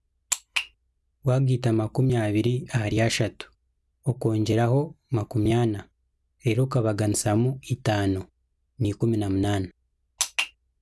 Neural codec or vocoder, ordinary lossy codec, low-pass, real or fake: none; none; none; real